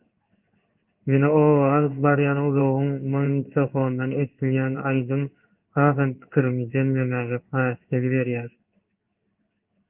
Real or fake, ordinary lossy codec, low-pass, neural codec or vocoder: fake; Opus, 32 kbps; 3.6 kHz; codec, 16 kHz in and 24 kHz out, 1 kbps, XY-Tokenizer